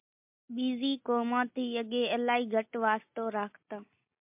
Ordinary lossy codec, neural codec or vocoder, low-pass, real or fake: MP3, 32 kbps; none; 3.6 kHz; real